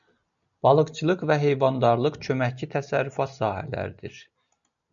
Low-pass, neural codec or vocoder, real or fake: 7.2 kHz; none; real